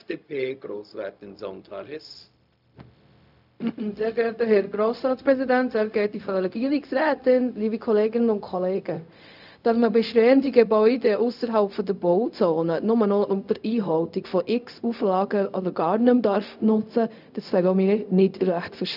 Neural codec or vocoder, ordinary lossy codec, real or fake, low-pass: codec, 16 kHz, 0.4 kbps, LongCat-Audio-Codec; none; fake; 5.4 kHz